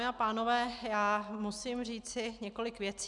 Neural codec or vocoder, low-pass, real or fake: none; 10.8 kHz; real